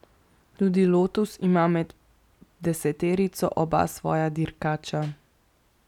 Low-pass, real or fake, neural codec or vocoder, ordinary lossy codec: 19.8 kHz; real; none; none